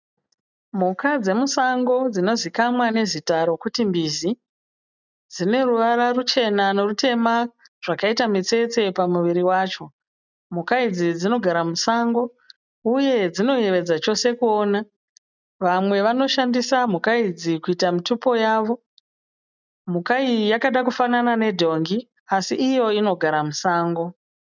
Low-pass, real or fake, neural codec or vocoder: 7.2 kHz; real; none